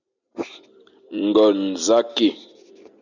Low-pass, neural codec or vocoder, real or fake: 7.2 kHz; none; real